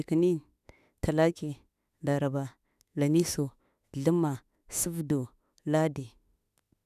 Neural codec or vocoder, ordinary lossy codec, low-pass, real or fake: autoencoder, 48 kHz, 32 numbers a frame, DAC-VAE, trained on Japanese speech; none; 14.4 kHz; fake